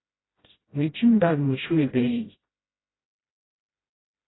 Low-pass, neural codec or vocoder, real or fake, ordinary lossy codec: 7.2 kHz; codec, 16 kHz, 0.5 kbps, FreqCodec, smaller model; fake; AAC, 16 kbps